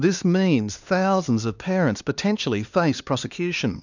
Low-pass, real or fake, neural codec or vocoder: 7.2 kHz; fake; codec, 16 kHz, 2 kbps, X-Codec, HuBERT features, trained on LibriSpeech